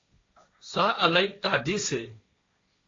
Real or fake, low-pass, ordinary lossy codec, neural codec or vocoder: fake; 7.2 kHz; AAC, 32 kbps; codec, 16 kHz, 1.1 kbps, Voila-Tokenizer